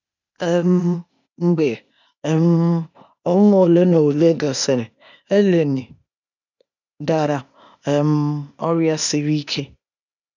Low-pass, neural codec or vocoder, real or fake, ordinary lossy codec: 7.2 kHz; codec, 16 kHz, 0.8 kbps, ZipCodec; fake; none